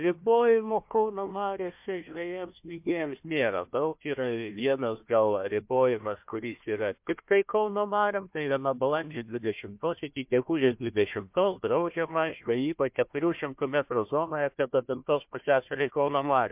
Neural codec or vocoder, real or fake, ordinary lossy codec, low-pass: codec, 16 kHz, 1 kbps, FunCodec, trained on Chinese and English, 50 frames a second; fake; MP3, 32 kbps; 3.6 kHz